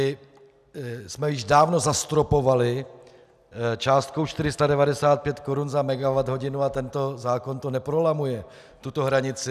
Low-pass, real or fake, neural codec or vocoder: 14.4 kHz; real; none